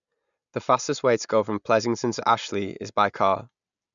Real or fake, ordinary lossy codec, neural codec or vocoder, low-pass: real; none; none; 7.2 kHz